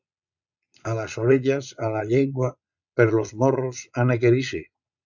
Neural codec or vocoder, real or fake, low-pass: vocoder, 22.05 kHz, 80 mel bands, Vocos; fake; 7.2 kHz